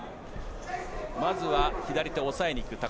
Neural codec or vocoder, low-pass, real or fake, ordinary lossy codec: none; none; real; none